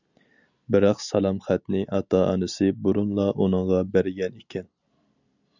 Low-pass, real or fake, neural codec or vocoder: 7.2 kHz; real; none